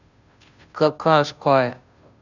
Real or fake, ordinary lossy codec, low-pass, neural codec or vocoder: fake; none; 7.2 kHz; codec, 16 kHz, 0.5 kbps, FunCodec, trained on Chinese and English, 25 frames a second